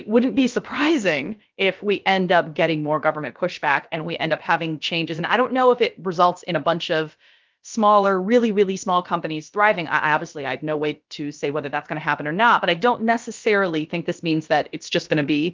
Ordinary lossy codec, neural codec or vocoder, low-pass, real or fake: Opus, 24 kbps; codec, 16 kHz, about 1 kbps, DyCAST, with the encoder's durations; 7.2 kHz; fake